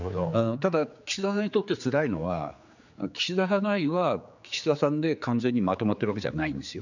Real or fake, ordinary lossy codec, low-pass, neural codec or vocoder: fake; none; 7.2 kHz; codec, 16 kHz, 4 kbps, X-Codec, HuBERT features, trained on general audio